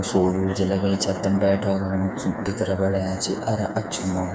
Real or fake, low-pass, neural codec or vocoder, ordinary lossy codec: fake; none; codec, 16 kHz, 4 kbps, FreqCodec, smaller model; none